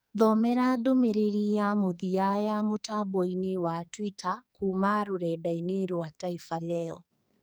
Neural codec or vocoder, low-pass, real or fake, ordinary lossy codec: codec, 44.1 kHz, 2.6 kbps, SNAC; none; fake; none